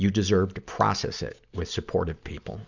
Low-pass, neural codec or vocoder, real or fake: 7.2 kHz; none; real